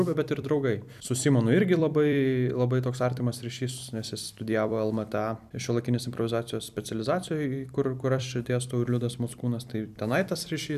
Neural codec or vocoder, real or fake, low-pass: vocoder, 44.1 kHz, 128 mel bands every 256 samples, BigVGAN v2; fake; 14.4 kHz